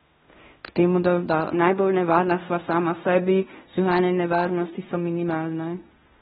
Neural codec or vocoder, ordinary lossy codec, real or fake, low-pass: codec, 16 kHz in and 24 kHz out, 0.9 kbps, LongCat-Audio-Codec, fine tuned four codebook decoder; AAC, 16 kbps; fake; 10.8 kHz